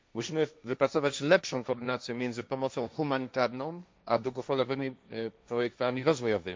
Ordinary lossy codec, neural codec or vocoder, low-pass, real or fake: none; codec, 16 kHz, 1.1 kbps, Voila-Tokenizer; none; fake